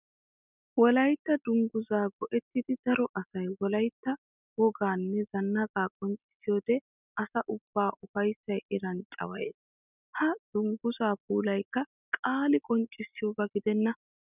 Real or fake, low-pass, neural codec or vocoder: real; 3.6 kHz; none